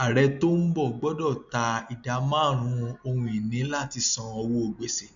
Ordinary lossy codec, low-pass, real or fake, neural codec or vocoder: none; 7.2 kHz; real; none